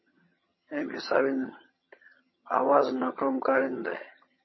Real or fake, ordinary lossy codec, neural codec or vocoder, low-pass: fake; MP3, 24 kbps; vocoder, 22.05 kHz, 80 mel bands, HiFi-GAN; 7.2 kHz